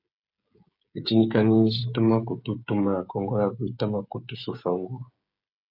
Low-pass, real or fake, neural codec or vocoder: 5.4 kHz; fake; codec, 16 kHz, 16 kbps, FreqCodec, smaller model